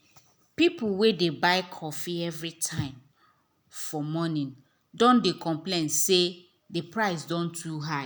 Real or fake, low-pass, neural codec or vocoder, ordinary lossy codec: real; none; none; none